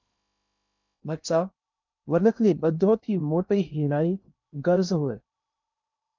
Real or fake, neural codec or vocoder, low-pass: fake; codec, 16 kHz in and 24 kHz out, 0.6 kbps, FocalCodec, streaming, 2048 codes; 7.2 kHz